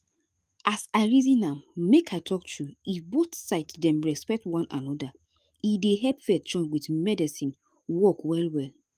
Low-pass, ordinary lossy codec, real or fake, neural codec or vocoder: 14.4 kHz; Opus, 32 kbps; fake; autoencoder, 48 kHz, 128 numbers a frame, DAC-VAE, trained on Japanese speech